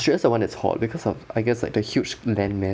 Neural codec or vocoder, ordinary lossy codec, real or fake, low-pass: none; none; real; none